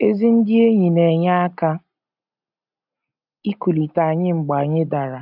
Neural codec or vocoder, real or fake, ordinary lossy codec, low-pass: none; real; none; 5.4 kHz